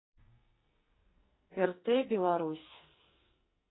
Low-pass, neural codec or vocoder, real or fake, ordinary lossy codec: 7.2 kHz; codec, 44.1 kHz, 2.6 kbps, SNAC; fake; AAC, 16 kbps